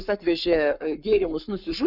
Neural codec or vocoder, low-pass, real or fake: codec, 44.1 kHz, 7.8 kbps, Pupu-Codec; 5.4 kHz; fake